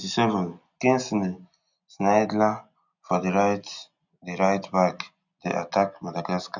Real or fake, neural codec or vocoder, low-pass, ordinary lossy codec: real; none; 7.2 kHz; none